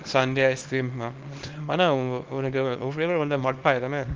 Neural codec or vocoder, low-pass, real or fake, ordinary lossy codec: codec, 24 kHz, 0.9 kbps, WavTokenizer, small release; 7.2 kHz; fake; Opus, 24 kbps